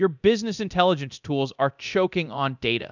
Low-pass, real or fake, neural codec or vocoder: 7.2 kHz; fake; codec, 24 kHz, 0.9 kbps, DualCodec